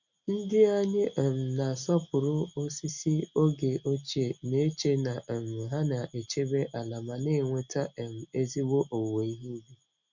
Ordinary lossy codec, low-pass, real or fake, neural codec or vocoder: none; 7.2 kHz; real; none